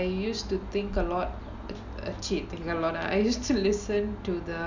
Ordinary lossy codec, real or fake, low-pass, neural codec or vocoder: none; real; 7.2 kHz; none